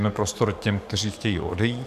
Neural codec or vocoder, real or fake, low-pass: codec, 44.1 kHz, 7.8 kbps, DAC; fake; 14.4 kHz